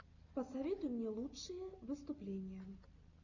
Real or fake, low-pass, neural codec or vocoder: real; 7.2 kHz; none